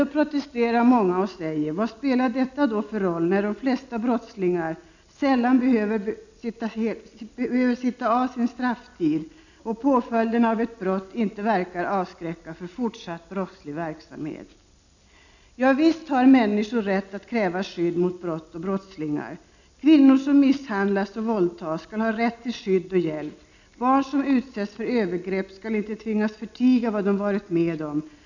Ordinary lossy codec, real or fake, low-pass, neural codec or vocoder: none; real; 7.2 kHz; none